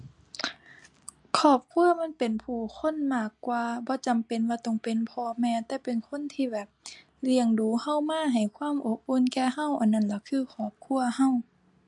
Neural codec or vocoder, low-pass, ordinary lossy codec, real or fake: none; 10.8 kHz; MP3, 64 kbps; real